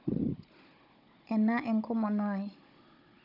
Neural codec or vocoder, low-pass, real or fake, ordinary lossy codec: codec, 16 kHz, 16 kbps, FunCodec, trained on Chinese and English, 50 frames a second; 5.4 kHz; fake; none